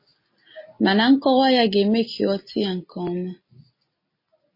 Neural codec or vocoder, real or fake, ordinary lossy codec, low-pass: none; real; MP3, 24 kbps; 5.4 kHz